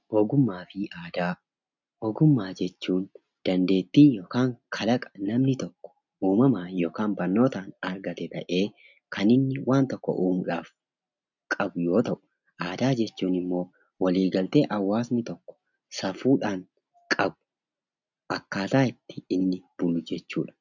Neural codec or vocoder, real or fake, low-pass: none; real; 7.2 kHz